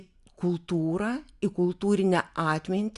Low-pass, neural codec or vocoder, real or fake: 10.8 kHz; none; real